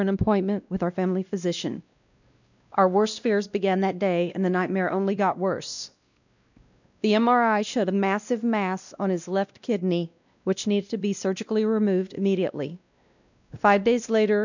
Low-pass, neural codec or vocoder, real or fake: 7.2 kHz; codec, 16 kHz, 1 kbps, X-Codec, WavLM features, trained on Multilingual LibriSpeech; fake